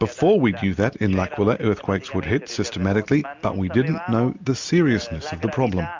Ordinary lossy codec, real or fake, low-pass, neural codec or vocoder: MP3, 64 kbps; real; 7.2 kHz; none